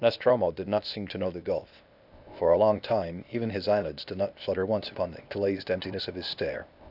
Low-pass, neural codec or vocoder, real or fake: 5.4 kHz; codec, 16 kHz, 0.8 kbps, ZipCodec; fake